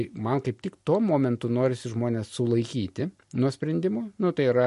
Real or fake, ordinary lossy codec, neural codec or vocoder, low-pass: real; MP3, 48 kbps; none; 14.4 kHz